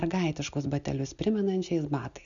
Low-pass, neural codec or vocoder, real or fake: 7.2 kHz; none; real